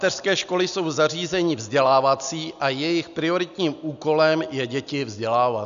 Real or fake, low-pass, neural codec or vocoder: real; 7.2 kHz; none